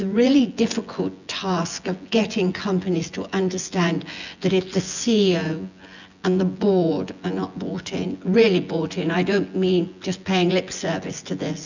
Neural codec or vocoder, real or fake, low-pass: vocoder, 24 kHz, 100 mel bands, Vocos; fake; 7.2 kHz